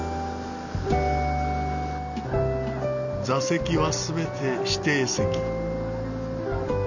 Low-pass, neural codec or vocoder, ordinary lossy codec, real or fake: 7.2 kHz; none; none; real